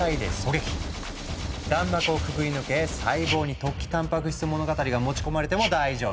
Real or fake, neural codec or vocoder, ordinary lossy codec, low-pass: real; none; none; none